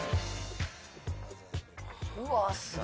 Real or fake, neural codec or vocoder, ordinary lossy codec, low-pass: real; none; none; none